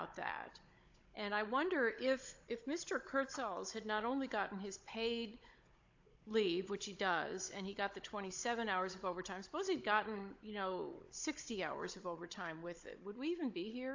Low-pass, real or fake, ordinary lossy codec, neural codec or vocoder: 7.2 kHz; fake; AAC, 48 kbps; codec, 16 kHz, 16 kbps, FunCodec, trained on Chinese and English, 50 frames a second